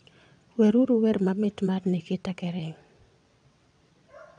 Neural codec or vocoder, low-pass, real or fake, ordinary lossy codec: vocoder, 22.05 kHz, 80 mel bands, WaveNeXt; 9.9 kHz; fake; none